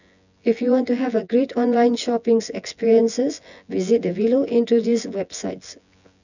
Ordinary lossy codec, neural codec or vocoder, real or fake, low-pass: none; vocoder, 24 kHz, 100 mel bands, Vocos; fake; 7.2 kHz